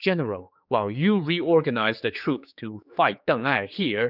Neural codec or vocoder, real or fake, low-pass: codec, 16 kHz, 4 kbps, X-Codec, HuBERT features, trained on general audio; fake; 5.4 kHz